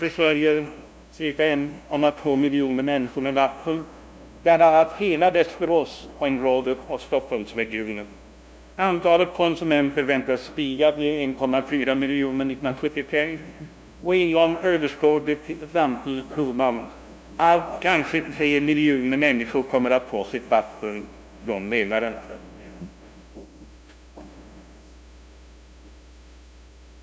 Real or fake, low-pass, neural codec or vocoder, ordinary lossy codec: fake; none; codec, 16 kHz, 0.5 kbps, FunCodec, trained on LibriTTS, 25 frames a second; none